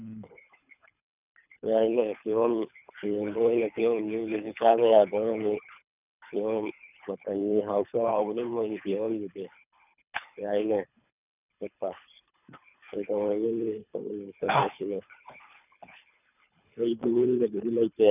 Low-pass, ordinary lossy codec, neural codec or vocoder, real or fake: 3.6 kHz; none; codec, 24 kHz, 3 kbps, HILCodec; fake